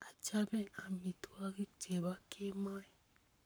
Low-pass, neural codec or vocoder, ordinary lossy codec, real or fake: none; codec, 44.1 kHz, 7.8 kbps, Pupu-Codec; none; fake